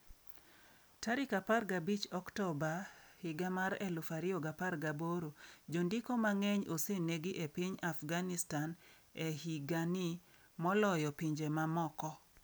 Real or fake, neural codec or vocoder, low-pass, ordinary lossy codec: real; none; none; none